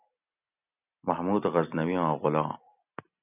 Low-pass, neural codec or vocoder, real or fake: 3.6 kHz; none; real